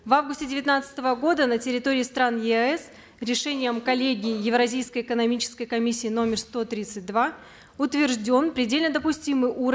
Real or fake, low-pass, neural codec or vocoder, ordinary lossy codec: real; none; none; none